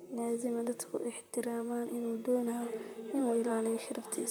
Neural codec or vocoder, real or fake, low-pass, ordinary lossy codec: vocoder, 44.1 kHz, 128 mel bands, Pupu-Vocoder; fake; none; none